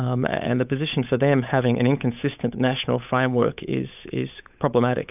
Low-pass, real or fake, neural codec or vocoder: 3.6 kHz; fake; codec, 16 kHz, 8 kbps, FunCodec, trained on Chinese and English, 25 frames a second